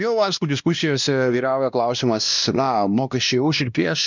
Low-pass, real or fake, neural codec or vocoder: 7.2 kHz; fake; codec, 16 kHz, 1 kbps, X-Codec, WavLM features, trained on Multilingual LibriSpeech